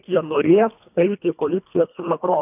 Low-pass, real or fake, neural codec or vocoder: 3.6 kHz; fake; codec, 24 kHz, 1.5 kbps, HILCodec